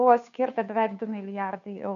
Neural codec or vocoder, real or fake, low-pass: codec, 16 kHz, 2 kbps, FunCodec, trained on Chinese and English, 25 frames a second; fake; 7.2 kHz